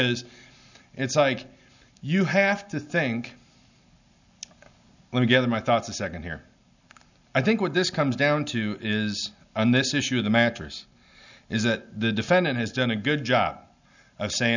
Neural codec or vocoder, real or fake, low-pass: none; real; 7.2 kHz